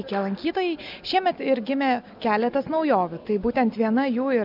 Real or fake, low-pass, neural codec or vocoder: real; 5.4 kHz; none